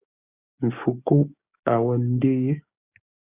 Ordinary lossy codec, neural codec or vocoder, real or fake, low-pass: Opus, 64 kbps; codec, 16 kHz, 6 kbps, DAC; fake; 3.6 kHz